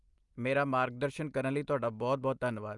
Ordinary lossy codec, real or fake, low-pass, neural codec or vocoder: Opus, 32 kbps; real; 10.8 kHz; none